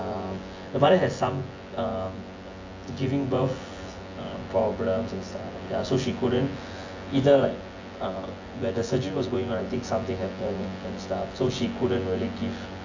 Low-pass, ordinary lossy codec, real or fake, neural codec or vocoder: 7.2 kHz; AAC, 48 kbps; fake; vocoder, 24 kHz, 100 mel bands, Vocos